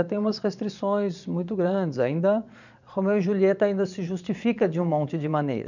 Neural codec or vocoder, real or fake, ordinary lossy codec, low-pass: vocoder, 22.05 kHz, 80 mel bands, Vocos; fake; none; 7.2 kHz